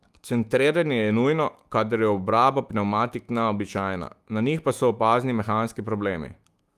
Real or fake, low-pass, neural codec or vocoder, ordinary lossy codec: fake; 14.4 kHz; autoencoder, 48 kHz, 128 numbers a frame, DAC-VAE, trained on Japanese speech; Opus, 32 kbps